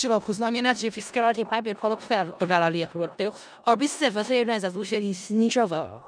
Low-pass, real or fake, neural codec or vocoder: 9.9 kHz; fake; codec, 16 kHz in and 24 kHz out, 0.4 kbps, LongCat-Audio-Codec, four codebook decoder